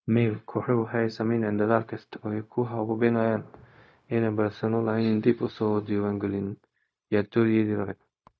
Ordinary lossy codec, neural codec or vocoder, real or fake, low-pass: none; codec, 16 kHz, 0.4 kbps, LongCat-Audio-Codec; fake; none